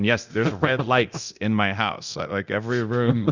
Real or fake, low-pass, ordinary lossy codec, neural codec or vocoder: fake; 7.2 kHz; Opus, 64 kbps; codec, 24 kHz, 0.9 kbps, DualCodec